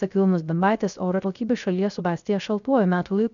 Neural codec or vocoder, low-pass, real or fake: codec, 16 kHz, 0.3 kbps, FocalCodec; 7.2 kHz; fake